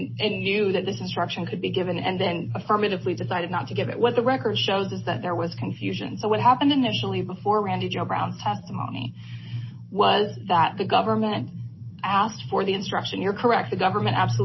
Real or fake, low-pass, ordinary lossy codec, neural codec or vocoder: real; 7.2 kHz; MP3, 24 kbps; none